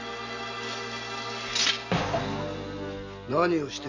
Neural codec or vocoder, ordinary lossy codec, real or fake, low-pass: none; none; real; 7.2 kHz